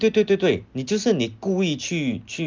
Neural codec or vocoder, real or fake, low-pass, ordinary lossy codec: none; real; 7.2 kHz; Opus, 32 kbps